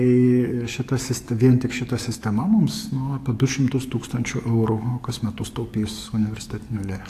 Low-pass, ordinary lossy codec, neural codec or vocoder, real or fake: 14.4 kHz; AAC, 64 kbps; codec, 44.1 kHz, 7.8 kbps, DAC; fake